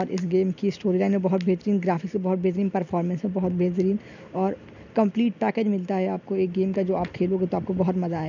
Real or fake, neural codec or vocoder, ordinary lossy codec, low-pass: real; none; none; 7.2 kHz